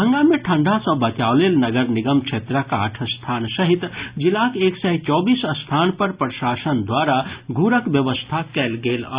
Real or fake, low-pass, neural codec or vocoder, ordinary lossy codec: real; 3.6 kHz; none; Opus, 64 kbps